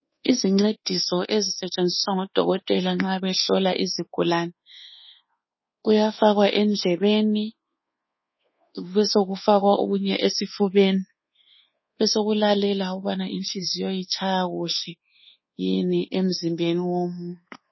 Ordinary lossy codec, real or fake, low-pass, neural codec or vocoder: MP3, 24 kbps; fake; 7.2 kHz; codec, 24 kHz, 1.2 kbps, DualCodec